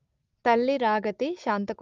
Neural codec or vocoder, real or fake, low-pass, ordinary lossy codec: none; real; 7.2 kHz; Opus, 32 kbps